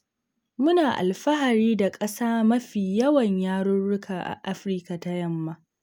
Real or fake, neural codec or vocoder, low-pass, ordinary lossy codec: real; none; none; none